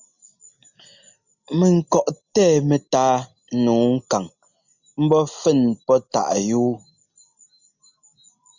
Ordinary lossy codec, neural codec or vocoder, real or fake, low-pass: Opus, 64 kbps; none; real; 7.2 kHz